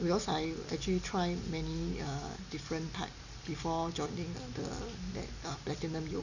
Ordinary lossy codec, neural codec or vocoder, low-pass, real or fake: none; none; 7.2 kHz; real